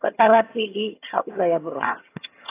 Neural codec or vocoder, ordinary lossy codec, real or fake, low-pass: vocoder, 22.05 kHz, 80 mel bands, HiFi-GAN; AAC, 24 kbps; fake; 3.6 kHz